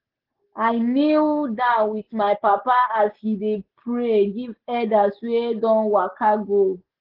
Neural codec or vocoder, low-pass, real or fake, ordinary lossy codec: none; 5.4 kHz; real; Opus, 16 kbps